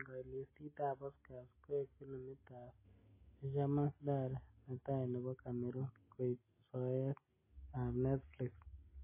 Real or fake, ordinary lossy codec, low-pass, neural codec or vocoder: real; MP3, 16 kbps; 3.6 kHz; none